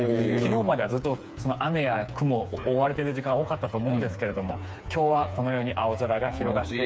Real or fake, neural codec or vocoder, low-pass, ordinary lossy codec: fake; codec, 16 kHz, 4 kbps, FreqCodec, smaller model; none; none